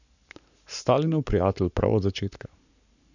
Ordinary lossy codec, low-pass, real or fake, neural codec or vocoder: none; 7.2 kHz; fake; codec, 44.1 kHz, 7.8 kbps, Pupu-Codec